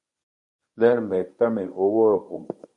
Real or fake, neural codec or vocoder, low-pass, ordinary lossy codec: fake; codec, 24 kHz, 0.9 kbps, WavTokenizer, medium speech release version 1; 10.8 kHz; MP3, 64 kbps